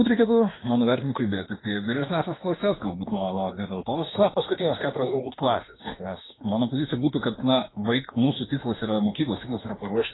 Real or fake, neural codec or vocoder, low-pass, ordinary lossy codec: fake; autoencoder, 48 kHz, 32 numbers a frame, DAC-VAE, trained on Japanese speech; 7.2 kHz; AAC, 16 kbps